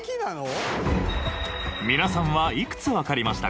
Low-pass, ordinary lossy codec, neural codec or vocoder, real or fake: none; none; none; real